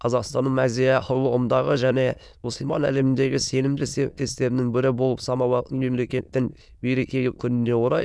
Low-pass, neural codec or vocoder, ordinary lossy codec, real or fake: none; autoencoder, 22.05 kHz, a latent of 192 numbers a frame, VITS, trained on many speakers; none; fake